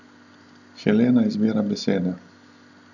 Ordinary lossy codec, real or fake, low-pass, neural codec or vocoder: none; real; 7.2 kHz; none